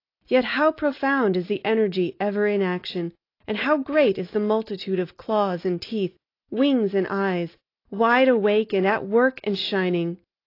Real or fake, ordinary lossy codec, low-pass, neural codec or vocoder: real; AAC, 32 kbps; 5.4 kHz; none